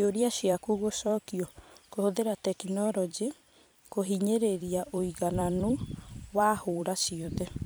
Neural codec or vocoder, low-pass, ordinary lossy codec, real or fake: vocoder, 44.1 kHz, 128 mel bands every 256 samples, BigVGAN v2; none; none; fake